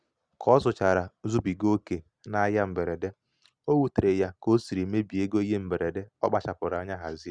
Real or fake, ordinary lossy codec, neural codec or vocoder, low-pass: real; Opus, 64 kbps; none; 9.9 kHz